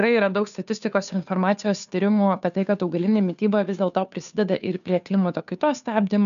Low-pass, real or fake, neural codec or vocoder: 7.2 kHz; fake; codec, 16 kHz, 2 kbps, FunCodec, trained on Chinese and English, 25 frames a second